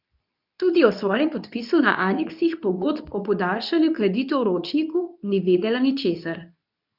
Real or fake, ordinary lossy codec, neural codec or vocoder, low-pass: fake; none; codec, 24 kHz, 0.9 kbps, WavTokenizer, medium speech release version 2; 5.4 kHz